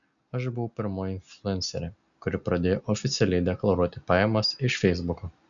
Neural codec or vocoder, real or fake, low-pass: none; real; 7.2 kHz